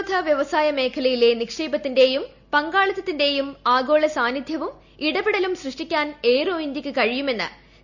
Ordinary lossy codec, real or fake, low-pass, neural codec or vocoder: none; real; 7.2 kHz; none